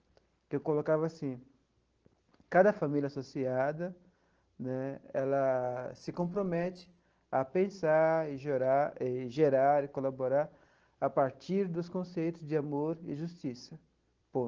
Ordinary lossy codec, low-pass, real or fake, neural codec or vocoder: Opus, 16 kbps; 7.2 kHz; real; none